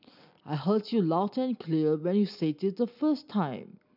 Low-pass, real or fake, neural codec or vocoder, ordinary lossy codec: 5.4 kHz; real; none; none